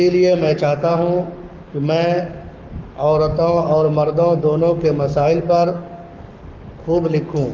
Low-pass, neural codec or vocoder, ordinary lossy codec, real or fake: 7.2 kHz; codec, 44.1 kHz, 7.8 kbps, Pupu-Codec; Opus, 24 kbps; fake